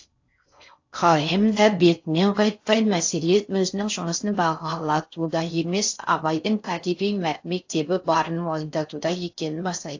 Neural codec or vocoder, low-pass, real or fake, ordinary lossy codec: codec, 16 kHz in and 24 kHz out, 0.6 kbps, FocalCodec, streaming, 4096 codes; 7.2 kHz; fake; AAC, 48 kbps